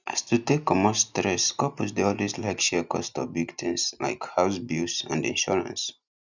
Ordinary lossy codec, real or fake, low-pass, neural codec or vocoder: none; real; 7.2 kHz; none